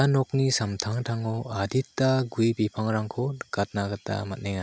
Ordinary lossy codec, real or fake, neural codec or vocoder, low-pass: none; real; none; none